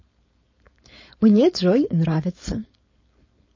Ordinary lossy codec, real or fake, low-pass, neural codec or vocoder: MP3, 32 kbps; fake; 7.2 kHz; codec, 16 kHz, 4.8 kbps, FACodec